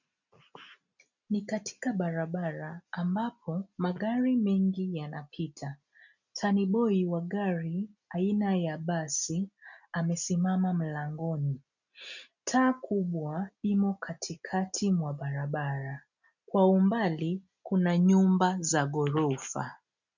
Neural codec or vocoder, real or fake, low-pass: none; real; 7.2 kHz